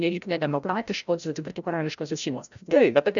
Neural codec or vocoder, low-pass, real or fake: codec, 16 kHz, 0.5 kbps, FreqCodec, larger model; 7.2 kHz; fake